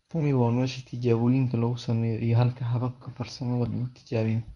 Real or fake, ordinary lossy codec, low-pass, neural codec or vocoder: fake; none; 10.8 kHz; codec, 24 kHz, 0.9 kbps, WavTokenizer, medium speech release version 1